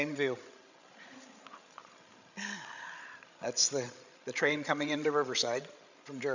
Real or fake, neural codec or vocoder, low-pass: fake; codec, 16 kHz, 16 kbps, FreqCodec, larger model; 7.2 kHz